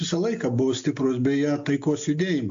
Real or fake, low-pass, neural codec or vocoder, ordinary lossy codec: real; 7.2 kHz; none; AAC, 64 kbps